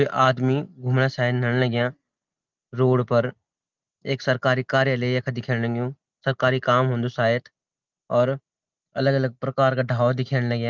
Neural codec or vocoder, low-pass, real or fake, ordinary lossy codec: none; 7.2 kHz; real; Opus, 24 kbps